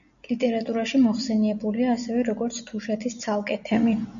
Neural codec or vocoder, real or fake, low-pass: none; real; 7.2 kHz